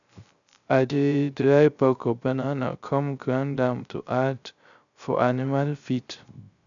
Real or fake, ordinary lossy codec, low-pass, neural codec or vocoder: fake; none; 7.2 kHz; codec, 16 kHz, 0.3 kbps, FocalCodec